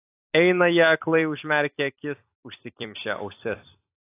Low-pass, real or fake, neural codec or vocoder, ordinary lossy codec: 3.6 kHz; fake; vocoder, 44.1 kHz, 128 mel bands every 256 samples, BigVGAN v2; AAC, 24 kbps